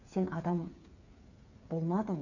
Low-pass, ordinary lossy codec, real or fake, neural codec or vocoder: 7.2 kHz; none; fake; codec, 16 kHz, 8 kbps, FreqCodec, smaller model